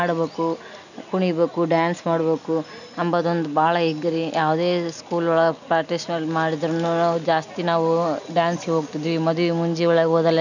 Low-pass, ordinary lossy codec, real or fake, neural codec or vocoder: 7.2 kHz; none; real; none